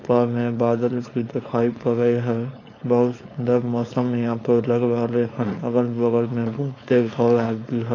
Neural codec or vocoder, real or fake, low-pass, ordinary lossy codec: codec, 16 kHz, 4.8 kbps, FACodec; fake; 7.2 kHz; AAC, 32 kbps